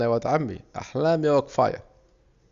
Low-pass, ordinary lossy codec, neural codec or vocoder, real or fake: 7.2 kHz; Opus, 64 kbps; none; real